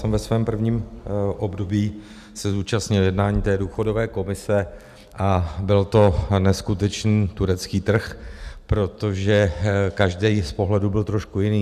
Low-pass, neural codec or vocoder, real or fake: 14.4 kHz; none; real